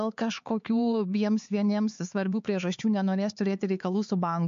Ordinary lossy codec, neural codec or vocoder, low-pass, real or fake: MP3, 48 kbps; codec, 16 kHz, 4 kbps, X-Codec, HuBERT features, trained on LibriSpeech; 7.2 kHz; fake